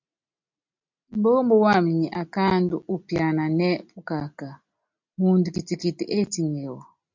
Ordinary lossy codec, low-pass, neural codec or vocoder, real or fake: MP3, 64 kbps; 7.2 kHz; none; real